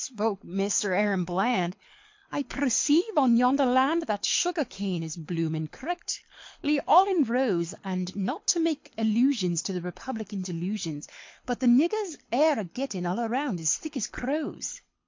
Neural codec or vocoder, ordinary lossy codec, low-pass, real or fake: codec, 24 kHz, 6 kbps, HILCodec; MP3, 48 kbps; 7.2 kHz; fake